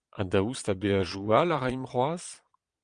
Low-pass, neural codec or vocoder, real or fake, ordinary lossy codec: 9.9 kHz; vocoder, 22.05 kHz, 80 mel bands, Vocos; fake; Opus, 32 kbps